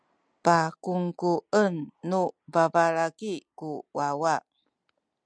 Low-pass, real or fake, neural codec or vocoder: 9.9 kHz; real; none